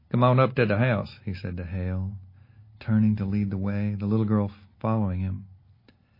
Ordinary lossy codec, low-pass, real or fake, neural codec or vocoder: MP3, 24 kbps; 5.4 kHz; real; none